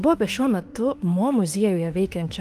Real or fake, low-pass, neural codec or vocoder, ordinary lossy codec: fake; 14.4 kHz; autoencoder, 48 kHz, 32 numbers a frame, DAC-VAE, trained on Japanese speech; Opus, 24 kbps